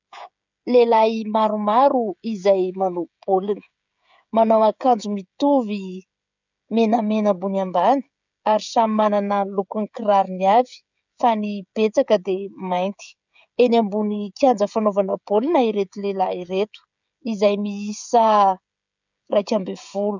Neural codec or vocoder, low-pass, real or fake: codec, 16 kHz, 16 kbps, FreqCodec, smaller model; 7.2 kHz; fake